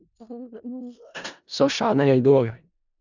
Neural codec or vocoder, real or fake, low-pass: codec, 16 kHz in and 24 kHz out, 0.4 kbps, LongCat-Audio-Codec, four codebook decoder; fake; 7.2 kHz